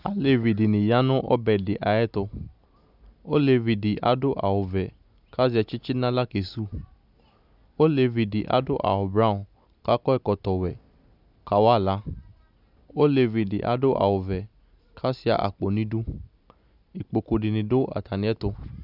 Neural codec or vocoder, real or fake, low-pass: none; real; 5.4 kHz